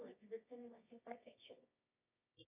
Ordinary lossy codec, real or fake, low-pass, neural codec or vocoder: AAC, 24 kbps; fake; 3.6 kHz; codec, 24 kHz, 0.9 kbps, WavTokenizer, medium music audio release